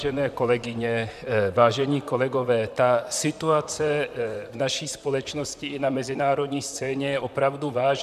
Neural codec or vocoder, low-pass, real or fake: vocoder, 44.1 kHz, 128 mel bands, Pupu-Vocoder; 14.4 kHz; fake